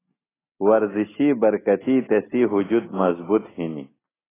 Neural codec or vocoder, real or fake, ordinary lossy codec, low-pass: none; real; AAC, 16 kbps; 3.6 kHz